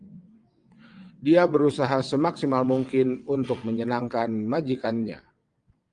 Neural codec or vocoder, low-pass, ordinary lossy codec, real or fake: vocoder, 22.05 kHz, 80 mel bands, WaveNeXt; 9.9 kHz; Opus, 32 kbps; fake